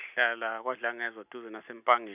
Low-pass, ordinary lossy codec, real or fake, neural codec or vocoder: 3.6 kHz; none; real; none